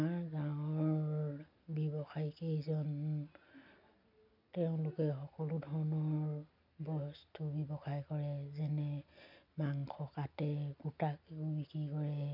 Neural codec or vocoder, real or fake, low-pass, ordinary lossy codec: none; real; 5.4 kHz; none